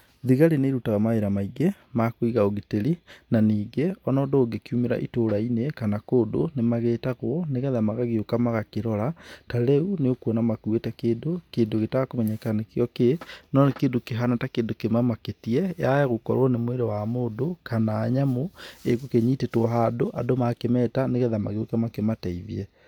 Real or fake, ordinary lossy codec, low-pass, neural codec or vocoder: real; none; 19.8 kHz; none